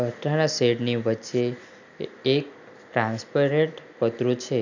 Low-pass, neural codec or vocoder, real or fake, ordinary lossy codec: 7.2 kHz; none; real; none